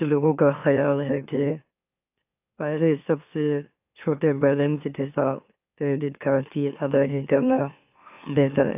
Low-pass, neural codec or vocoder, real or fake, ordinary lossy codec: 3.6 kHz; autoencoder, 44.1 kHz, a latent of 192 numbers a frame, MeloTTS; fake; AAC, 32 kbps